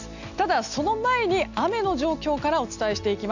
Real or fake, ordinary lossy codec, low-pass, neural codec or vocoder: real; none; 7.2 kHz; none